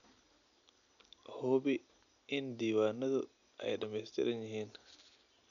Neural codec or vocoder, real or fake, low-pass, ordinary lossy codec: none; real; 7.2 kHz; none